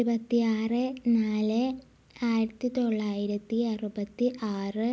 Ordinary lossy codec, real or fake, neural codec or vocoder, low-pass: none; real; none; none